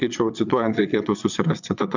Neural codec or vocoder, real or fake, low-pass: vocoder, 22.05 kHz, 80 mel bands, Vocos; fake; 7.2 kHz